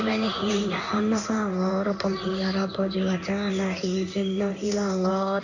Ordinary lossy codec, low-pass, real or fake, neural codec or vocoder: none; 7.2 kHz; fake; codec, 16 kHz in and 24 kHz out, 1 kbps, XY-Tokenizer